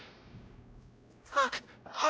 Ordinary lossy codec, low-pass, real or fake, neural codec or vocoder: none; none; fake; codec, 16 kHz, 0.5 kbps, X-Codec, WavLM features, trained on Multilingual LibriSpeech